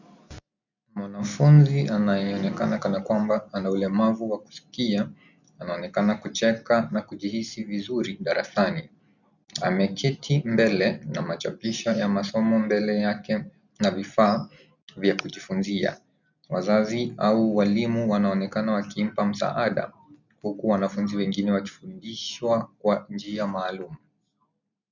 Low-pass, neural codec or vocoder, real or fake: 7.2 kHz; none; real